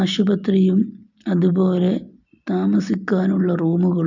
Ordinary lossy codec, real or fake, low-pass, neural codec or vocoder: none; real; 7.2 kHz; none